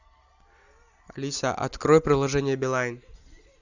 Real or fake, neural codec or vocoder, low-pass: real; none; 7.2 kHz